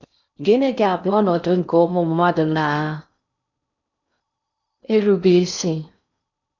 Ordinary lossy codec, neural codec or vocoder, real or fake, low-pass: none; codec, 16 kHz in and 24 kHz out, 0.6 kbps, FocalCodec, streaming, 4096 codes; fake; 7.2 kHz